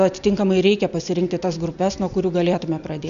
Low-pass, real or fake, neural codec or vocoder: 7.2 kHz; real; none